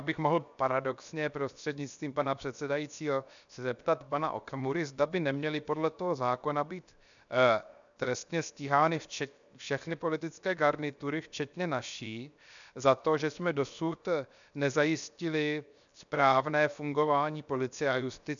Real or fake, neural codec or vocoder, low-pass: fake; codec, 16 kHz, 0.7 kbps, FocalCodec; 7.2 kHz